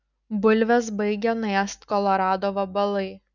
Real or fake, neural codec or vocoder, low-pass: real; none; 7.2 kHz